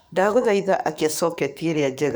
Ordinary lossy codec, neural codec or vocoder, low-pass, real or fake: none; codec, 44.1 kHz, 7.8 kbps, DAC; none; fake